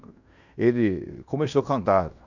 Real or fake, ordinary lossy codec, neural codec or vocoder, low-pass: fake; none; codec, 16 kHz in and 24 kHz out, 0.9 kbps, LongCat-Audio-Codec, fine tuned four codebook decoder; 7.2 kHz